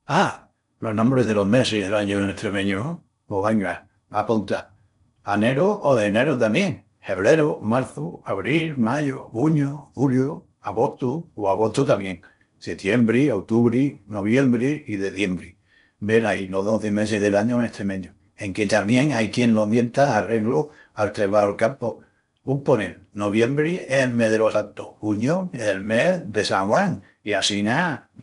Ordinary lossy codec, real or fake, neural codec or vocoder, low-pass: none; fake; codec, 16 kHz in and 24 kHz out, 0.6 kbps, FocalCodec, streaming, 4096 codes; 10.8 kHz